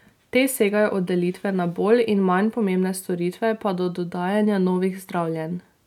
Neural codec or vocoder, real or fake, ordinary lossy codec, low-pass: none; real; none; 19.8 kHz